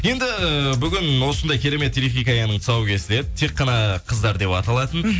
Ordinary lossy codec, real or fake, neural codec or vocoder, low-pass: none; real; none; none